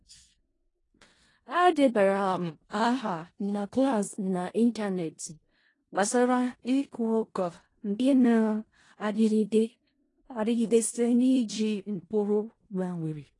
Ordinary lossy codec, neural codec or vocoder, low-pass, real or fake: AAC, 32 kbps; codec, 16 kHz in and 24 kHz out, 0.4 kbps, LongCat-Audio-Codec, four codebook decoder; 10.8 kHz; fake